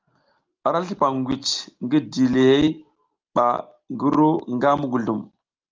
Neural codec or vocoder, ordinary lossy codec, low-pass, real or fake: none; Opus, 32 kbps; 7.2 kHz; real